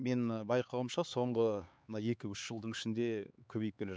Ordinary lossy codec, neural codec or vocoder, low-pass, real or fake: none; codec, 16 kHz, 4 kbps, X-Codec, HuBERT features, trained on LibriSpeech; none; fake